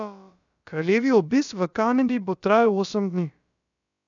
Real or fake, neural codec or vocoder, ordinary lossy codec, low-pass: fake; codec, 16 kHz, about 1 kbps, DyCAST, with the encoder's durations; none; 7.2 kHz